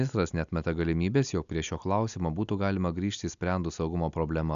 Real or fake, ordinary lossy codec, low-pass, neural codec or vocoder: real; MP3, 96 kbps; 7.2 kHz; none